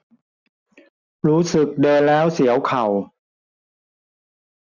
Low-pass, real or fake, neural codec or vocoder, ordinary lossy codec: 7.2 kHz; real; none; none